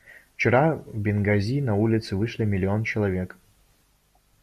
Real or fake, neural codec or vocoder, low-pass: real; none; 14.4 kHz